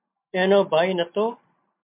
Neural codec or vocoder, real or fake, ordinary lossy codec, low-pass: none; real; MP3, 32 kbps; 3.6 kHz